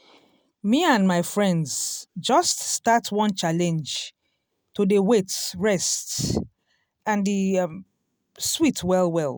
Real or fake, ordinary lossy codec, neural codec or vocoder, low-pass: real; none; none; none